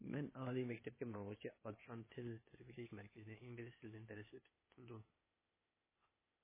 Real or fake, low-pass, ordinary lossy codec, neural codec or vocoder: fake; 3.6 kHz; MP3, 16 kbps; codec, 16 kHz, 0.8 kbps, ZipCodec